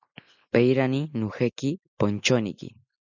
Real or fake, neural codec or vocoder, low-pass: real; none; 7.2 kHz